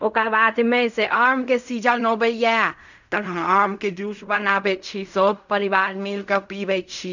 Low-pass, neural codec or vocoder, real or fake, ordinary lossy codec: 7.2 kHz; codec, 16 kHz in and 24 kHz out, 0.4 kbps, LongCat-Audio-Codec, fine tuned four codebook decoder; fake; none